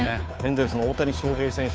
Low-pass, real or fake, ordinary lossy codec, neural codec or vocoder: none; fake; none; codec, 16 kHz, 2 kbps, FunCodec, trained on Chinese and English, 25 frames a second